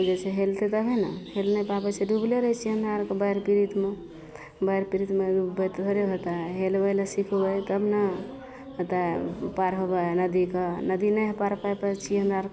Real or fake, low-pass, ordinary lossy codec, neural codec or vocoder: real; none; none; none